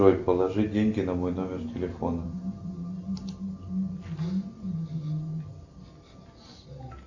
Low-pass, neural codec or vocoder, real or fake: 7.2 kHz; none; real